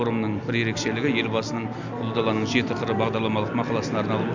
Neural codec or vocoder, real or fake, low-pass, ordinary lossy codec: none; real; 7.2 kHz; MP3, 64 kbps